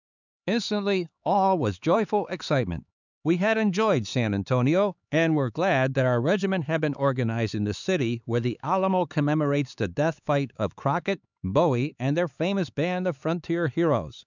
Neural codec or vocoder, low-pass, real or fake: codec, 16 kHz, 4 kbps, X-Codec, HuBERT features, trained on LibriSpeech; 7.2 kHz; fake